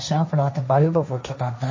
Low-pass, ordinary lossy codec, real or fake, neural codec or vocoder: 7.2 kHz; MP3, 32 kbps; fake; codec, 16 kHz, 1.1 kbps, Voila-Tokenizer